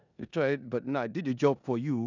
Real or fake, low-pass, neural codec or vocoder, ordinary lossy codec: fake; 7.2 kHz; codec, 16 kHz in and 24 kHz out, 0.9 kbps, LongCat-Audio-Codec, four codebook decoder; none